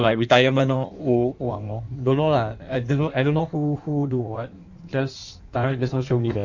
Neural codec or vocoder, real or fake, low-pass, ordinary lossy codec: codec, 16 kHz in and 24 kHz out, 1.1 kbps, FireRedTTS-2 codec; fake; 7.2 kHz; none